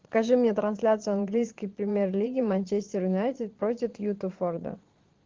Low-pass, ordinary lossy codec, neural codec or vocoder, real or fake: 7.2 kHz; Opus, 16 kbps; vocoder, 22.05 kHz, 80 mel bands, Vocos; fake